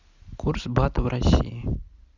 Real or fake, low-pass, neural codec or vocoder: real; 7.2 kHz; none